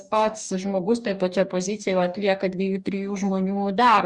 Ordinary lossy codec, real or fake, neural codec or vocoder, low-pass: Opus, 64 kbps; fake; codec, 44.1 kHz, 2.6 kbps, DAC; 10.8 kHz